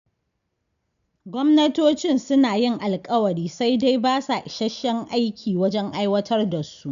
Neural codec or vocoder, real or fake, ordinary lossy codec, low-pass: none; real; none; 7.2 kHz